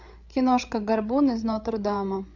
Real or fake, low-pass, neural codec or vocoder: fake; 7.2 kHz; codec, 16 kHz, 8 kbps, FreqCodec, larger model